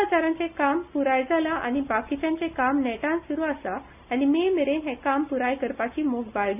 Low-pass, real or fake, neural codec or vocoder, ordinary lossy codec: 3.6 kHz; real; none; none